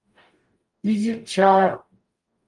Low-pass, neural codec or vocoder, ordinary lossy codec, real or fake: 10.8 kHz; codec, 44.1 kHz, 0.9 kbps, DAC; Opus, 32 kbps; fake